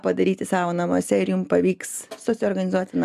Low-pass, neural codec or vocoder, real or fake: 14.4 kHz; none; real